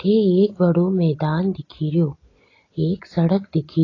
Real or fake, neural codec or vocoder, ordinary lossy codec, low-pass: real; none; AAC, 32 kbps; 7.2 kHz